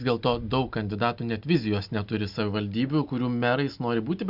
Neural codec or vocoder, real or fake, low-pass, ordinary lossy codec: none; real; 5.4 kHz; Opus, 64 kbps